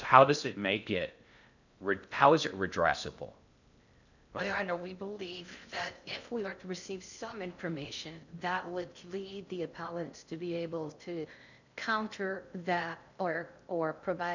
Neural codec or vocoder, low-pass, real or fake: codec, 16 kHz in and 24 kHz out, 0.6 kbps, FocalCodec, streaming, 2048 codes; 7.2 kHz; fake